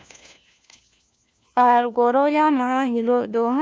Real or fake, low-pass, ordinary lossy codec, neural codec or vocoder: fake; none; none; codec, 16 kHz, 1 kbps, FunCodec, trained on LibriTTS, 50 frames a second